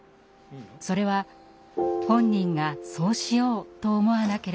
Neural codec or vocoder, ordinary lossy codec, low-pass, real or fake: none; none; none; real